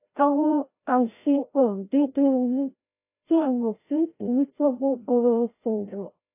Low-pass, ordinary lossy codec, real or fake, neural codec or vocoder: 3.6 kHz; AAC, 24 kbps; fake; codec, 16 kHz, 0.5 kbps, FreqCodec, larger model